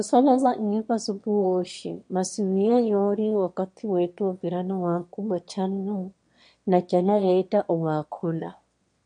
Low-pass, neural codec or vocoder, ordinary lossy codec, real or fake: 9.9 kHz; autoencoder, 22.05 kHz, a latent of 192 numbers a frame, VITS, trained on one speaker; MP3, 48 kbps; fake